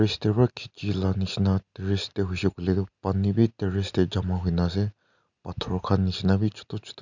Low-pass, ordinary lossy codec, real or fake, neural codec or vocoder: 7.2 kHz; none; real; none